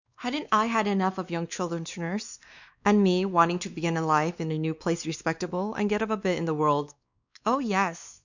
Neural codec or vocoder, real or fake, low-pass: codec, 16 kHz, 2 kbps, X-Codec, WavLM features, trained on Multilingual LibriSpeech; fake; 7.2 kHz